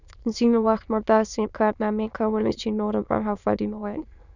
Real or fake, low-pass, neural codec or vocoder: fake; 7.2 kHz; autoencoder, 22.05 kHz, a latent of 192 numbers a frame, VITS, trained on many speakers